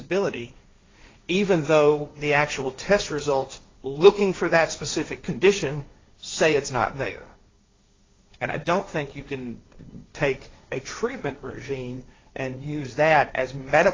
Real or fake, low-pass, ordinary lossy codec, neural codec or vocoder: fake; 7.2 kHz; AAC, 32 kbps; codec, 16 kHz, 1.1 kbps, Voila-Tokenizer